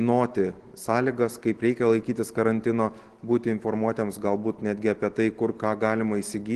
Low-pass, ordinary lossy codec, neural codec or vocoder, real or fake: 10.8 kHz; Opus, 16 kbps; none; real